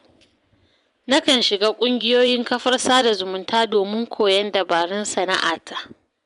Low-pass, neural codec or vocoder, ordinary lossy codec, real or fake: 10.8 kHz; vocoder, 24 kHz, 100 mel bands, Vocos; MP3, 96 kbps; fake